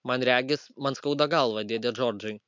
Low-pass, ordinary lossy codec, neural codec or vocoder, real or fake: 7.2 kHz; MP3, 64 kbps; none; real